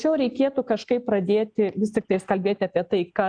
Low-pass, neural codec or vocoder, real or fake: 9.9 kHz; none; real